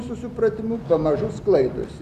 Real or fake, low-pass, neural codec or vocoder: real; 14.4 kHz; none